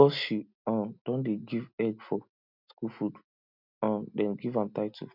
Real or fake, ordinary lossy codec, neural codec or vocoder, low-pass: real; none; none; 5.4 kHz